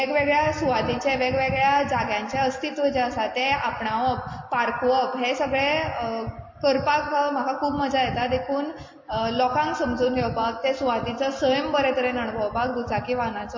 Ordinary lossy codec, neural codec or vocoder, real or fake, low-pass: MP3, 32 kbps; none; real; 7.2 kHz